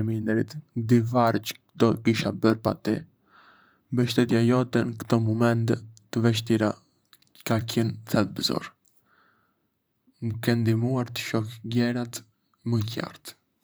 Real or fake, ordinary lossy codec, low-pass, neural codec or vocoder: fake; none; none; vocoder, 44.1 kHz, 128 mel bands, Pupu-Vocoder